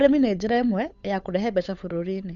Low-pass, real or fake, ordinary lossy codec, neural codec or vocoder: 7.2 kHz; fake; none; codec, 16 kHz, 8 kbps, FunCodec, trained on Chinese and English, 25 frames a second